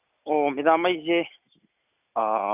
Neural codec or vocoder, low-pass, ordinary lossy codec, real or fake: none; 3.6 kHz; none; real